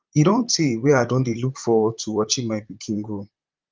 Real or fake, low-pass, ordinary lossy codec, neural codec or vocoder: fake; 7.2 kHz; Opus, 24 kbps; vocoder, 44.1 kHz, 128 mel bands, Pupu-Vocoder